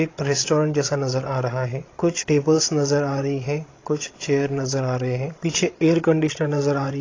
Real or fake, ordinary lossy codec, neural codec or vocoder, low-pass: fake; AAC, 32 kbps; codec, 16 kHz, 16 kbps, FreqCodec, larger model; 7.2 kHz